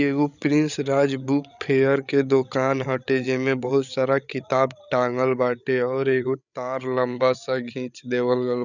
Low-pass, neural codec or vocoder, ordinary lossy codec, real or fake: 7.2 kHz; codec, 16 kHz, 8 kbps, FreqCodec, larger model; none; fake